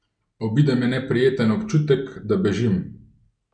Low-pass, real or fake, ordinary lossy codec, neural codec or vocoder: 9.9 kHz; real; none; none